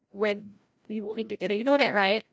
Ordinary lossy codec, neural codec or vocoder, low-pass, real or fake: none; codec, 16 kHz, 0.5 kbps, FreqCodec, larger model; none; fake